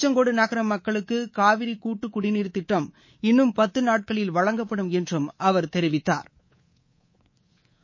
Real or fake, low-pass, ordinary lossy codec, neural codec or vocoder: fake; 7.2 kHz; MP3, 32 kbps; codec, 24 kHz, 3.1 kbps, DualCodec